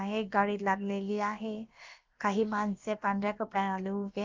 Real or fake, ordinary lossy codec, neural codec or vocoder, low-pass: fake; Opus, 24 kbps; codec, 16 kHz, about 1 kbps, DyCAST, with the encoder's durations; 7.2 kHz